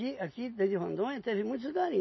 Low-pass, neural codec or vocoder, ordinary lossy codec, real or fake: 7.2 kHz; none; MP3, 24 kbps; real